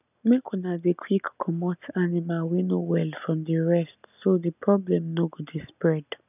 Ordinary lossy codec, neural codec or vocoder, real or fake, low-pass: none; none; real; 3.6 kHz